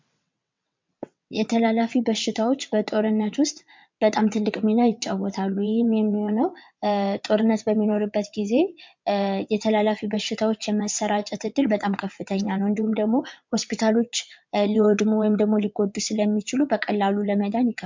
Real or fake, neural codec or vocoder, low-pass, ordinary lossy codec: fake; vocoder, 22.05 kHz, 80 mel bands, WaveNeXt; 7.2 kHz; MP3, 64 kbps